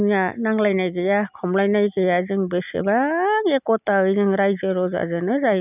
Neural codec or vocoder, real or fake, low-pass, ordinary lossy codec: none; real; 3.6 kHz; none